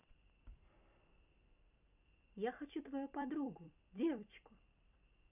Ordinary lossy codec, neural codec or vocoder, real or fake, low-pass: none; vocoder, 44.1 kHz, 128 mel bands every 256 samples, BigVGAN v2; fake; 3.6 kHz